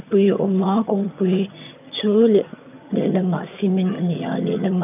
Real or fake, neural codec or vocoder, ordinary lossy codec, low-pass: fake; vocoder, 22.05 kHz, 80 mel bands, HiFi-GAN; none; 3.6 kHz